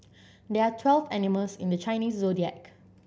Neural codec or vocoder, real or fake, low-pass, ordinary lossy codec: none; real; none; none